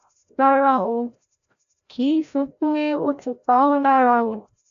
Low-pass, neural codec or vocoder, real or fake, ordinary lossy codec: 7.2 kHz; codec, 16 kHz, 0.5 kbps, FreqCodec, larger model; fake; MP3, 96 kbps